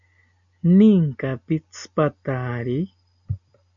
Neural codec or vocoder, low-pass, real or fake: none; 7.2 kHz; real